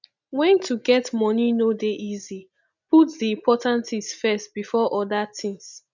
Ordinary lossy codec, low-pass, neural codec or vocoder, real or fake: none; 7.2 kHz; none; real